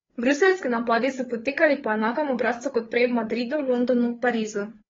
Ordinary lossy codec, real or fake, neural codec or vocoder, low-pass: AAC, 24 kbps; fake; codec, 16 kHz, 4 kbps, FreqCodec, larger model; 7.2 kHz